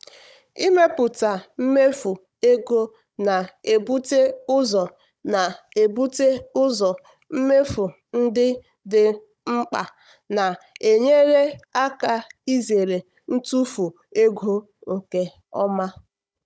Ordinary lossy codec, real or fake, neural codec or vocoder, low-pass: none; fake; codec, 16 kHz, 16 kbps, FunCodec, trained on Chinese and English, 50 frames a second; none